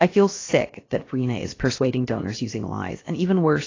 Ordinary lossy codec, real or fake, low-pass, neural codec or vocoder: AAC, 32 kbps; fake; 7.2 kHz; codec, 16 kHz, about 1 kbps, DyCAST, with the encoder's durations